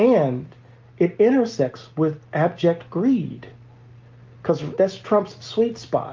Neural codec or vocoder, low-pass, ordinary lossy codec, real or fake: none; 7.2 kHz; Opus, 32 kbps; real